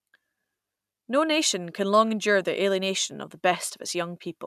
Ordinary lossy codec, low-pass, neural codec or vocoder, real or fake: none; 14.4 kHz; none; real